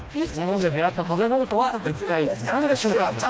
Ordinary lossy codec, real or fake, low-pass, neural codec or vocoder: none; fake; none; codec, 16 kHz, 1 kbps, FreqCodec, smaller model